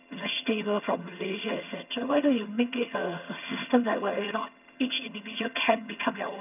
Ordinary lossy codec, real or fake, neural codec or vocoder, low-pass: none; fake; vocoder, 22.05 kHz, 80 mel bands, HiFi-GAN; 3.6 kHz